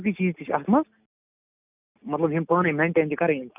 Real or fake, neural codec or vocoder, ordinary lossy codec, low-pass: real; none; none; 3.6 kHz